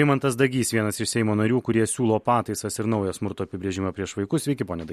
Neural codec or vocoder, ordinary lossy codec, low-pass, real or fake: none; MP3, 64 kbps; 14.4 kHz; real